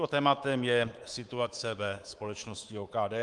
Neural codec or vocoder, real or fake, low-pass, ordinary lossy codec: codec, 24 kHz, 3.1 kbps, DualCodec; fake; 10.8 kHz; Opus, 24 kbps